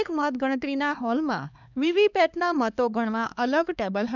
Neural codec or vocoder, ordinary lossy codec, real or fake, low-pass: codec, 16 kHz, 2 kbps, X-Codec, HuBERT features, trained on LibriSpeech; none; fake; 7.2 kHz